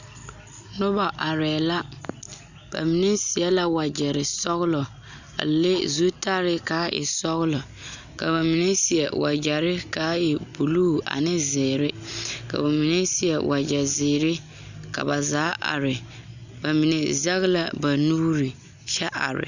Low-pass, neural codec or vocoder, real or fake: 7.2 kHz; none; real